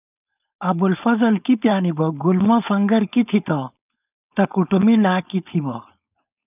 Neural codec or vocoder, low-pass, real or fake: codec, 16 kHz, 4.8 kbps, FACodec; 3.6 kHz; fake